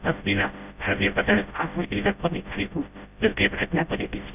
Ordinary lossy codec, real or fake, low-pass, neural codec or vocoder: none; fake; 3.6 kHz; codec, 16 kHz, 0.5 kbps, FreqCodec, smaller model